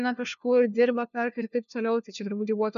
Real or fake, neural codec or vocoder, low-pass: fake; codec, 16 kHz, 1 kbps, FunCodec, trained on LibriTTS, 50 frames a second; 7.2 kHz